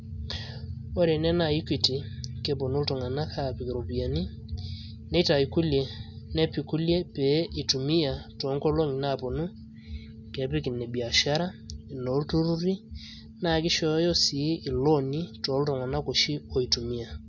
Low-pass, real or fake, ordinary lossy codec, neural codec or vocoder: 7.2 kHz; real; none; none